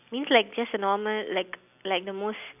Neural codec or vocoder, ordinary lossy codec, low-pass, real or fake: none; none; 3.6 kHz; real